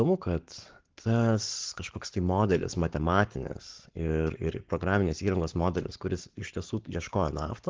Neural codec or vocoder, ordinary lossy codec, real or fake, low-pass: codec, 44.1 kHz, 7.8 kbps, Pupu-Codec; Opus, 16 kbps; fake; 7.2 kHz